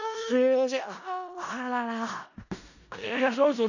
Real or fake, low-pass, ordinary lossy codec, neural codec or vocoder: fake; 7.2 kHz; none; codec, 16 kHz in and 24 kHz out, 0.4 kbps, LongCat-Audio-Codec, four codebook decoder